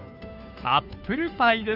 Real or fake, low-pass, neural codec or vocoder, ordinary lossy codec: fake; 5.4 kHz; codec, 16 kHz, 2 kbps, FunCodec, trained on Chinese and English, 25 frames a second; none